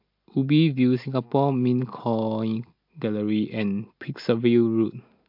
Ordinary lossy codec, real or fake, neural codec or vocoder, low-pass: MP3, 48 kbps; real; none; 5.4 kHz